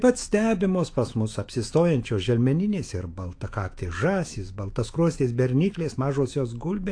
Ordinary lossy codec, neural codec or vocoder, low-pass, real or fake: AAC, 48 kbps; autoencoder, 48 kHz, 128 numbers a frame, DAC-VAE, trained on Japanese speech; 9.9 kHz; fake